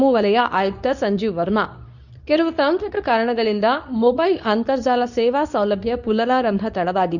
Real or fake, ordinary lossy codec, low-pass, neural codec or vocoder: fake; none; 7.2 kHz; codec, 24 kHz, 0.9 kbps, WavTokenizer, medium speech release version 2